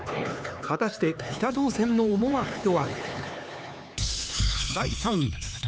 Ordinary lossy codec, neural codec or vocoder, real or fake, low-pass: none; codec, 16 kHz, 4 kbps, X-Codec, HuBERT features, trained on LibriSpeech; fake; none